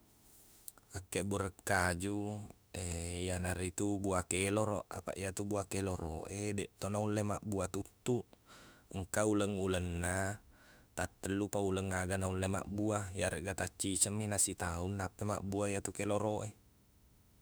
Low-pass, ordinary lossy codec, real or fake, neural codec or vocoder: none; none; fake; autoencoder, 48 kHz, 32 numbers a frame, DAC-VAE, trained on Japanese speech